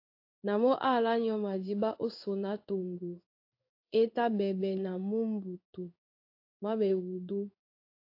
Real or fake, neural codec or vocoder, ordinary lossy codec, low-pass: fake; codec, 16 kHz in and 24 kHz out, 1 kbps, XY-Tokenizer; AAC, 32 kbps; 5.4 kHz